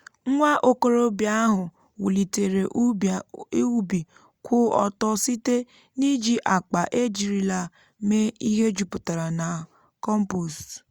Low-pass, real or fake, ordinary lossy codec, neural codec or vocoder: 19.8 kHz; real; Opus, 64 kbps; none